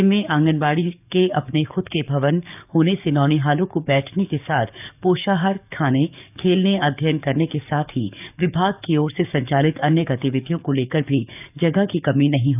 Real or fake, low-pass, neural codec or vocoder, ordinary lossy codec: fake; 3.6 kHz; codec, 44.1 kHz, 7.8 kbps, DAC; none